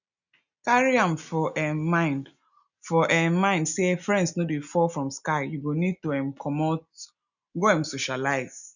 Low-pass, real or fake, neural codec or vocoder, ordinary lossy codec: 7.2 kHz; real; none; none